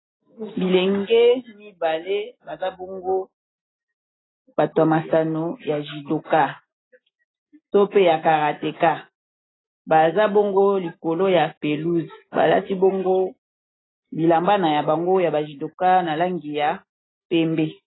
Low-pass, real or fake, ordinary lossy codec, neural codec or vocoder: 7.2 kHz; real; AAC, 16 kbps; none